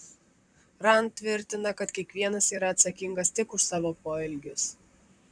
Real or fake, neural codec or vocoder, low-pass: fake; vocoder, 44.1 kHz, 128 mel bands, Pupu-Vocoder; 9.9 kHz